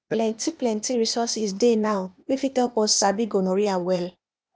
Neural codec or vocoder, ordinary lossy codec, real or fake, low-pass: codec, 16 kHz, 0.8 kbps, ZipCodec; none; fake; none